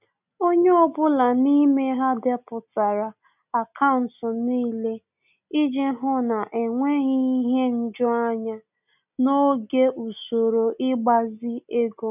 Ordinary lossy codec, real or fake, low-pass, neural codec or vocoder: none; real; 3.6 kHz; none